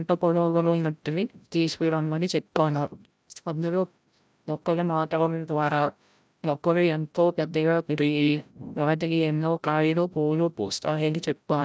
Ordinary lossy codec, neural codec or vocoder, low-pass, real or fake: none; codec, 16 kHz, 0.5 kbps, FreqCodec, larger model; none; fake